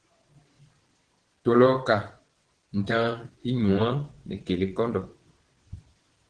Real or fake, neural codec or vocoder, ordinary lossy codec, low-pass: fake; vocoder, 22.05 kHz, 80 mel bands, WaveNeXt; Opus, 16 kbps; 9.9 kHz